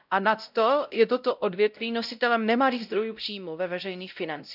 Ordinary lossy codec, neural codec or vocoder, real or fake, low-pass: none; codec, 16 kHz, 0.5 kbps, X-Codec, WavLM features, trained on Multilingual LibriSpeech; fake; 5.4 kHz